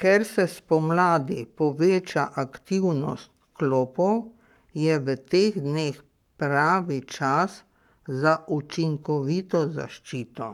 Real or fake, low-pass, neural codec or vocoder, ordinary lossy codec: fake; 19.8 kHz; codec, 44.1 kHz, 7.8 kbps, Pupu-Codec; none